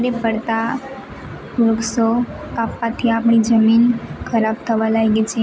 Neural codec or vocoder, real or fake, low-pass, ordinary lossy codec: none; real; none; none